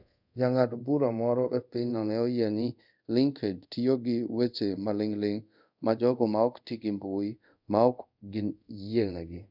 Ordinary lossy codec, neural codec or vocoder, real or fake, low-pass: none; codec, 24 kHz, 0.5 kbps, DualCodec; fake; 5.4 kHz